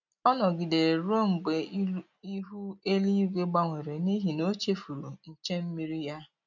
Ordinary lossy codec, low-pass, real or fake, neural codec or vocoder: none; 7.2 kHz; real; none